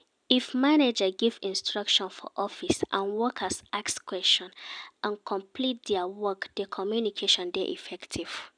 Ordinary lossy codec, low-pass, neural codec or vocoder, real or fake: none; 9.9 kHz; none; real